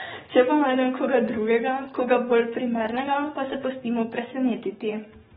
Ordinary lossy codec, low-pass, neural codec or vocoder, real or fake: AAC, 16 kbps; 19.8 kHz; codec, 44.1 kHz, 7.8 kbps, DAC; fake